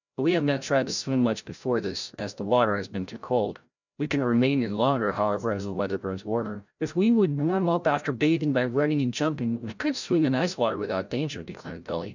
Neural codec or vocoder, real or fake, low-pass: codec, 16 kHz, 0.5 kbps, FreqCodec, larger model; fake; 7.2 kHz